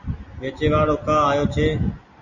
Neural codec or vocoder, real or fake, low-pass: none; real; 7.2 kHz